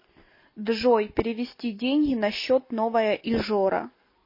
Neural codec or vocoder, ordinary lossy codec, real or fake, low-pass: none; MP3, 24 kbps; real; 5.4 kHz